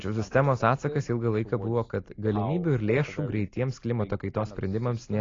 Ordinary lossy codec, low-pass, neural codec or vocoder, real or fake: AAC, 32 kbps; 7.2 kHz; none; real